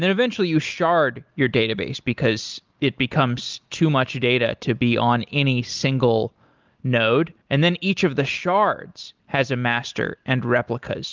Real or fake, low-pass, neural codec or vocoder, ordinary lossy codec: real; 7.2 kHz; none; Opus, 24 kbps